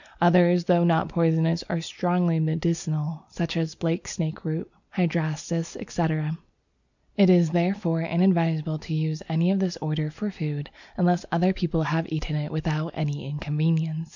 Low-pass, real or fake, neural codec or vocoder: 7.2 kHz; real; none